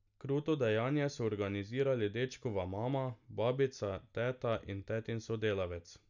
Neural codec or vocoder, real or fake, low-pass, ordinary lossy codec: none; real; 7.2 kHz; none